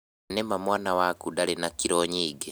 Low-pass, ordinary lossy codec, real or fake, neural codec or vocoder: none; none; real; none